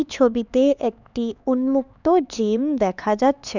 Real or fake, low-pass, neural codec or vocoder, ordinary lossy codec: fake; 7.2 kHz; codec, 16 kHz, 4 kbps, X-Codec, HuBERT features, trained on LibriSpeech; none